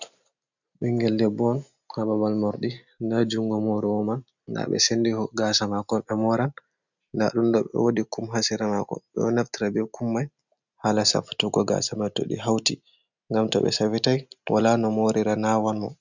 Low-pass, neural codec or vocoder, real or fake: 7.2 kHz; none; real